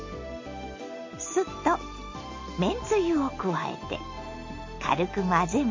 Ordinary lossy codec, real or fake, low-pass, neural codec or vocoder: MP3, 32 kbps; real; 7.2 kHz; none